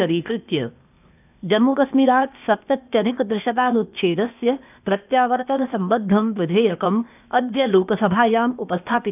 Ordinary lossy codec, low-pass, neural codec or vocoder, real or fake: none; 3.6 kHz; codec, 16 kHz, 0.8 kbps, ZipCodec; fake